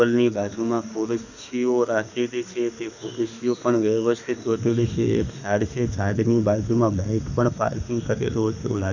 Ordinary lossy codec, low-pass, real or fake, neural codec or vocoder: none; 7.2 kHz; fake; autoencoder, 48 kHz, 32 numbers a frame, DAC-VAE, trained on Japanese speech